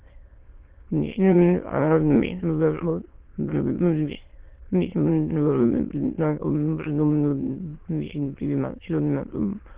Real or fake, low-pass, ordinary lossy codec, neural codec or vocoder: fake; 3.6 kHz; Opus, 16 kbps; autoencoder, 22.05 kHz, a latent of 192 numbers a frame, VITS, trained on many speakers